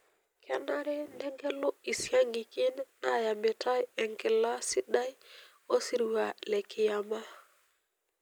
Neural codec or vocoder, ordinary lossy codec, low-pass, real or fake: none; none; none; real